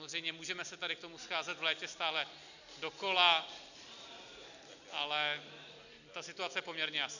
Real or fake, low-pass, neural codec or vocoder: real; 7.2 kHz; none